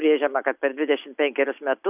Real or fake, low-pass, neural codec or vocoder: real; 3.6 kHz; none